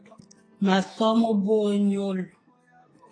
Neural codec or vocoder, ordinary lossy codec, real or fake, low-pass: codec, 44.1 kHz, 2.6 kbps, SNAC; AAC, 32 kbps; fake; 9.9 kHz